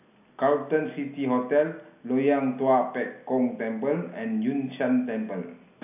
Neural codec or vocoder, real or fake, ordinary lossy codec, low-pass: none; real; AAC, 32 kbps; 3.6 kHz